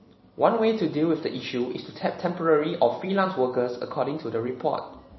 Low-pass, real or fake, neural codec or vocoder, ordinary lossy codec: 7.2 kHz; fake; vocoder, 44.1 kHz, 128 mel bands every 256 samples, BigVGAN v2; MP3, 24 kbps